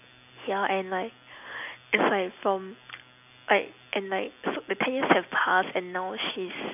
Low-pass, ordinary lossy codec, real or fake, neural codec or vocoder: 3.6 kHz; none; real; none